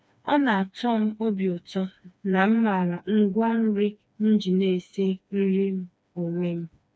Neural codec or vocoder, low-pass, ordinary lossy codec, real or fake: codec, 16 kHz, 2 kbps, FreqCodec, smaller model; none; none; fake